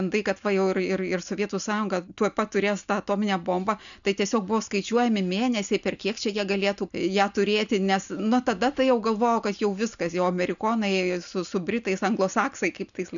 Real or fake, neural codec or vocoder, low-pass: real; none; 7.2 kHz